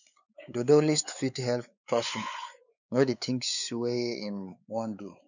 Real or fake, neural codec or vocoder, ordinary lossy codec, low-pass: fake; codec, 16 kHz, 4 kbps, X-Codec, WavLM features, trained on Multilingual LibriSpeech; none; 7.2 kHz